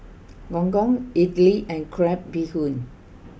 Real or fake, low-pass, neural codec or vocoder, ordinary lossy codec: real; none; none; none